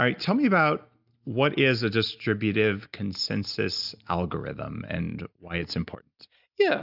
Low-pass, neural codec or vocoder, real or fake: 5.4 kHz; none; real